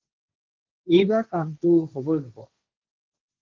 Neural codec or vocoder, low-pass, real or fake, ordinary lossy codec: codec, 16 kHz, 1.1 kbps, Voila-Tokenizer; 7.2 kHz; fake; Opus, 32 kbps